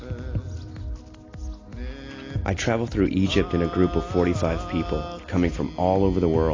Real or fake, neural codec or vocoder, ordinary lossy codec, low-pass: real; none; AAC, 32 kbps; 7.2 kHz